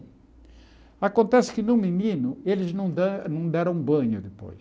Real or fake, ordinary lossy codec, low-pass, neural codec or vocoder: real; none; none; none